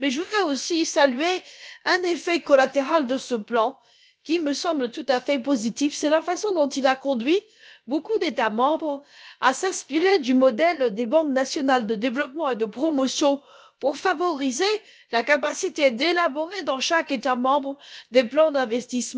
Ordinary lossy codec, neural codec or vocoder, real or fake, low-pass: none; codec, 16 kHz, about 1 kbps, DyCAST, with the encoder's durations; fake; none